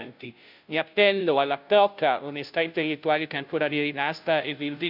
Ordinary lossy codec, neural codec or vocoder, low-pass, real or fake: none; codec, 16 kHz, 0.5 kbps, FunCodec, trained on Chinese and English, 25 frames a second; 5.4 kHz; fake